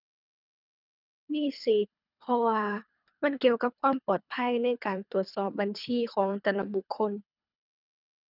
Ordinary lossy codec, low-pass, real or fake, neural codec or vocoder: none; 5.4 kHz; fake; codec, 24 kHz, 3 kbps, HILCodec